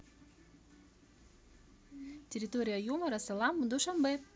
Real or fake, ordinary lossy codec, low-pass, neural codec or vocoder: real; none; none; none